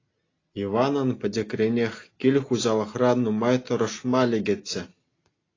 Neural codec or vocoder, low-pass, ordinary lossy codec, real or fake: none; 7.2 kHz; AAC, 32 kbps; real